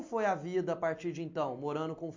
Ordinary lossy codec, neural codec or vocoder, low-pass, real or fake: MP3, 48 kbps; none; 7.2 kHz; real